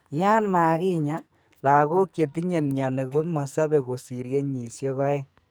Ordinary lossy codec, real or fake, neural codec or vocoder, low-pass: none; fake; codec, 44.1 kHz, 2.6 kbps, SNAC; none